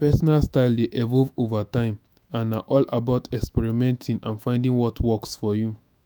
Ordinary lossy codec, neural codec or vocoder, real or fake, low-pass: none; autoencoder, 48 kHz, 128 numbers a frame, DAC-VAE, trained on Japanese speech; fake; none